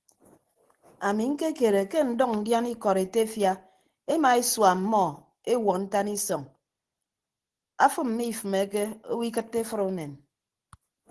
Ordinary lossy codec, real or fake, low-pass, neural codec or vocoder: Opus, 16 kbps; real; 10.8 kHz; none